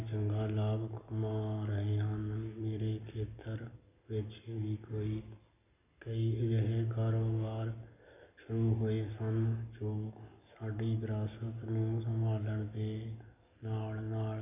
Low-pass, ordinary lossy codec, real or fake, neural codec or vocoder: 3.6 kHz; none; real; none